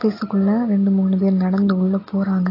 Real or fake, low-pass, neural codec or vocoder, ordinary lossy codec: real; 5.4 kHz; none; AAC, 24 kbps